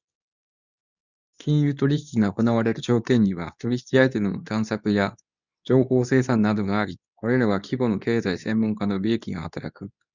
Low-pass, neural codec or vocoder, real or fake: 7.2 kHz; codec, 24 kHz, 0.9 kbps, WavTokenizer, medium speech release version 2; fake